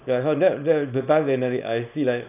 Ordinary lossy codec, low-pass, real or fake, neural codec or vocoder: none; 3.6 kHz; fake; codec, 24 kHz, 0.9 kbps, WavTokenizer, small release